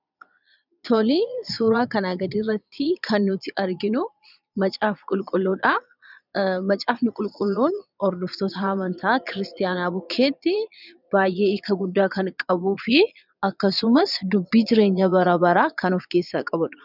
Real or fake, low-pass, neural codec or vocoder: fake; 5.4 kHz; vocoder, 22.05 kHz, 80 mel bands, Vocos